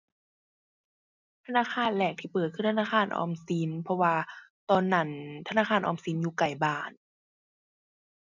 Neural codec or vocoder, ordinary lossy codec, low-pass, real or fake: none; none; 7.2 kHz; real